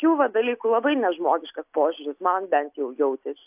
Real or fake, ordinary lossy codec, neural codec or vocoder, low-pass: real; AAC, 32 kbps; none; 3.6 kHz